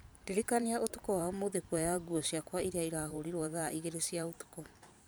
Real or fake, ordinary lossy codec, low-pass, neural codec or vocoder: fake; none; none; vocoder, 44.1 kHz, 128 mel bands every 512 samples, BigVGAN v2